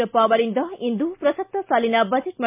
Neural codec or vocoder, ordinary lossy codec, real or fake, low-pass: none; MP3, 32 kbps; real; 3.6 kHz